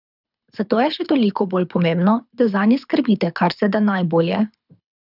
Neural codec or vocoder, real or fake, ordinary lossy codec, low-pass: codec, 24 kHz, 6 kbps, HILCodec; fake; none; 5.4 kHz